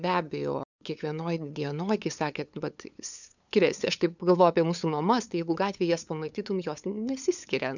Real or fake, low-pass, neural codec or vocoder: fake; 7.2 kHz; codec, 16 kHz, 8 kbps, FunCodec, trained on LibriTTS, 25 frames a second